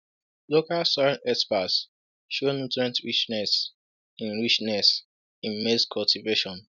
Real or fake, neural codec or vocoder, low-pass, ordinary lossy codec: real; none; 7.2 kHz; none